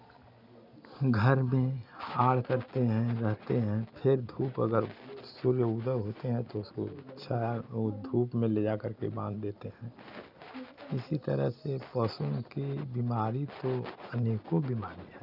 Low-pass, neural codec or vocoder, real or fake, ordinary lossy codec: 5.4 kHz; none; real; none